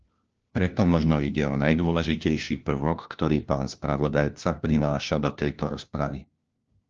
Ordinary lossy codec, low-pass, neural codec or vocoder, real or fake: Opus, 24 kbps; 7.2 kHz; codec, 16 kHz, 1 kbps, FunCodec, trained on LibriTTS, 50 frames a second; fake